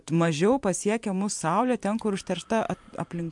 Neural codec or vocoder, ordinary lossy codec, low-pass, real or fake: vocoder, 44.1 kHz, 128 mel bands every 512 samples, BigVGAN v2; MP3, 64 kbps; 10.8 kHz; fake